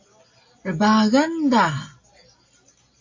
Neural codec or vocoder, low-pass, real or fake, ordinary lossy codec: none; 7.2 kHz; real; AAC, 48 kbps